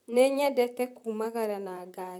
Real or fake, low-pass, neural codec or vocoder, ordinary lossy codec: fake; 19.8 kHz; vocoder, 44.1 kHz, 128 mel bands, Pupu-Vocoder; none